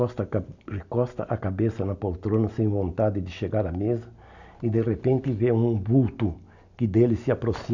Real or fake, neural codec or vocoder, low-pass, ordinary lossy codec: real; none; 7.2 kHz; none